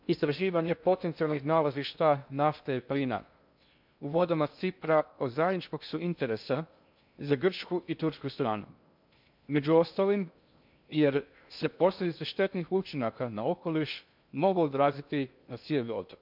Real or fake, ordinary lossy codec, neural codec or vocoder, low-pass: fake; MP3, 48 kbps; codec, 16 kHz in and 24 kHz out, 0.6 kbps, FocalCodec, streaming, 2048 codes; 5.4 kHz